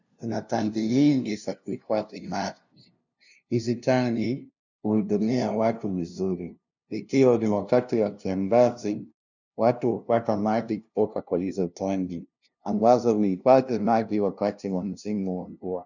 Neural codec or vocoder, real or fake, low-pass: codec, 16 kHz, 0.5 kbps, FunCodec, trained on LibriTTS, 25 frames a second; fake; 7.2 kHz